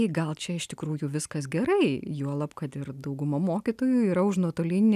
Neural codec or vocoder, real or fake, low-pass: none; real; 14.4 kHz